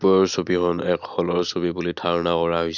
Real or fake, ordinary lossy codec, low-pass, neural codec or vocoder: real; none; 7.2 kHz; none